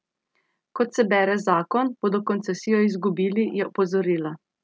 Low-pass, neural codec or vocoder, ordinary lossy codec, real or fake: none; none; none; real